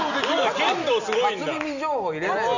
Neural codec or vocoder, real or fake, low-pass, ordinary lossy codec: none; real; 7.2 kHz; none